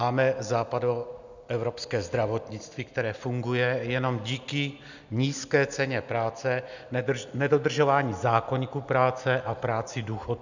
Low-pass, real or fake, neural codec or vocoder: 7.2 kHz; real; none